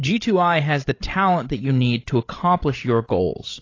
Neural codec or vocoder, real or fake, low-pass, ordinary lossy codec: codec, 16 kHz, 16 kbps, FreqCodec, larger model; fake; 7.2 kHz; AAC, 32 kbps